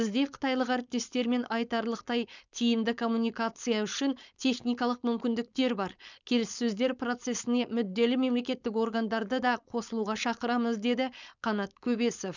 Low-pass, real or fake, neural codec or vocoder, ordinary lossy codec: 7.2 kHz; fake; codec, 16 kHz, 4.8 kbps, FACodec; none